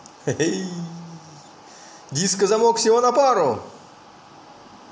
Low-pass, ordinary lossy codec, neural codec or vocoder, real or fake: none; none; none; real